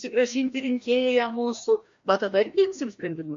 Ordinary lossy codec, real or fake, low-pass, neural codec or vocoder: MP3, 48 kbps; fake; 7.2 kHz; codec, 16 kHz, 1 kbps, FreqCodec, larger model